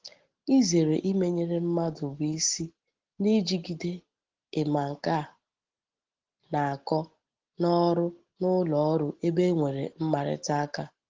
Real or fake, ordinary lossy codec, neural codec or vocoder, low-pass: real; Opus, 16 kbps; none; 7.2 kHz